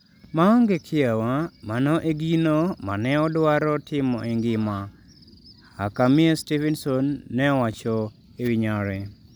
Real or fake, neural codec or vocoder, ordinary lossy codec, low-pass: real; none; none; none